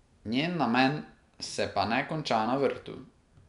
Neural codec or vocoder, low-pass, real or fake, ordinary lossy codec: none; 10.8 kHz; real; none